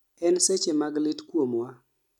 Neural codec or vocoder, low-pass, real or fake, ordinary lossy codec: none; 19.8 kHz; real; none